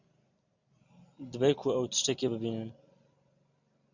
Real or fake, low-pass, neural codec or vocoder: real; 7.2 kHz; none